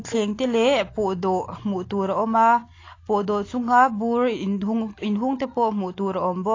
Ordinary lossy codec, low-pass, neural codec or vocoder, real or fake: AAC, 32 kbps; 7.2 kHz; none; real